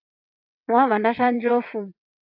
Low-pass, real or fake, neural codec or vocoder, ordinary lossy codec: 5.4 kHz; fake; vocoder, 22.05 kHz, 80 mel bands, WaveNeXt; AAC, 48 kbps